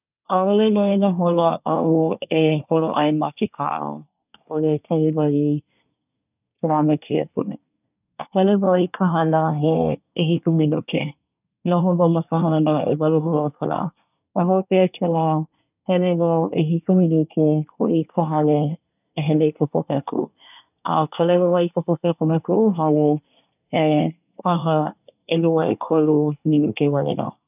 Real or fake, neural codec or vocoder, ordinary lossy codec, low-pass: fake; codec, 24 kHz, 1 kbps, SNAC; none; 3.6 kHz